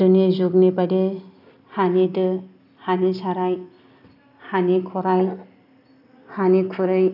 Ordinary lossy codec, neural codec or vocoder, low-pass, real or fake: none; none; 5.4 kHz; real